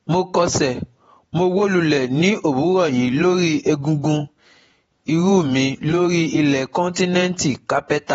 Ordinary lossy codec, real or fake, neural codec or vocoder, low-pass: AAC, 24 kbps; fake; vocoder, 48 kHz, 128 mel bands, Vocos; 19.8 kHz